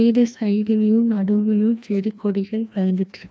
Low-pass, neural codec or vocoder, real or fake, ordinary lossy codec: none; codec, 16 kHz, 1 kbps, FreqCodec, larger model; fake; none